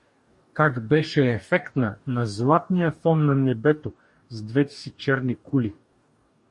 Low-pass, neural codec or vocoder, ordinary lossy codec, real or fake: 10.8 kHz; codec, 44.1 kHz, 2.6 kbps, DAC; MP3, 48 kbps; fake